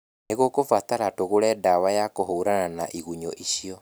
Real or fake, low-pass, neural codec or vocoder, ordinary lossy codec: real; none; none; none